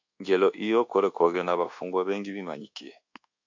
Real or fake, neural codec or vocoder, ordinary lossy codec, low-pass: fake; codec, 24 kHz, 1.2 kbps, DualCodec; AAC, 48 kbps; 7.2 kHz